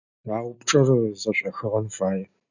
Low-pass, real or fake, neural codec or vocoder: 7.2 kHz; real; none